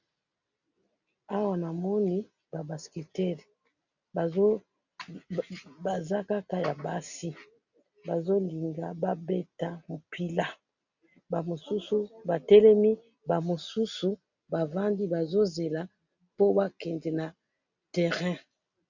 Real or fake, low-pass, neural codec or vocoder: real; 7.2 kHz; none